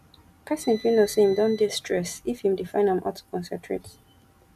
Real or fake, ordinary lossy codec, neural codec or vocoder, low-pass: real; none; none; 14.4 kHz